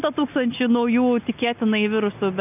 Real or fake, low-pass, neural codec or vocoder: real; 3.6 kHz; none